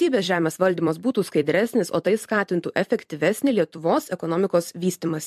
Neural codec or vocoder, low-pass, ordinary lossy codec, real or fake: vocoder, 44.1 kHz, 128 mel bands every 256 samples, BigVGAN v2; 14.4 kHz; MP3, 64 kbps; fake